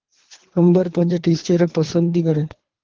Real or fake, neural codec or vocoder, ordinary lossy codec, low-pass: fake; codec, 24 kHz, 3 kbps, HILCodec; Opus, 16 kbps; 7.2 kHz